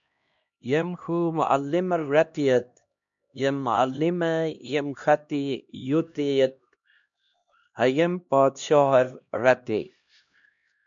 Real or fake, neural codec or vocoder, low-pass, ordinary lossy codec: fake; codec, 16 kHz, 1 kbps, X-Codec, HuBERT features, trained on LibriSpeech; 7.2 kHz; MP3, 64 kbps